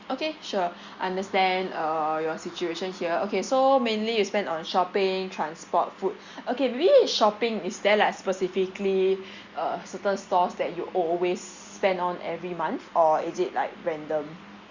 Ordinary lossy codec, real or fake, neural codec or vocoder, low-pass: Opus, 64 kbps; real; none; 7.2 kHz